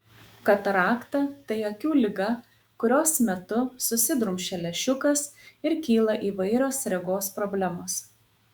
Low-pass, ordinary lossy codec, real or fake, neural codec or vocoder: 19.8 kHz; Opus, 64 kbps; fake; autoencoder, 48 kHz, 128 numbers a frame, DAC-VAE, trained on Japanese speech